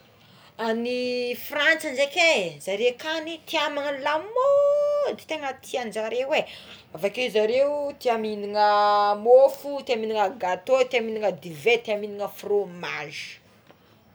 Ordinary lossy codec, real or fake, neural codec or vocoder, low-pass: none; real; none; none